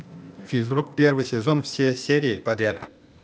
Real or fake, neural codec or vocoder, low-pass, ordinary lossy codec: fake; codec, 16 kHz, 0.8 kbps, ZipCodec; none; none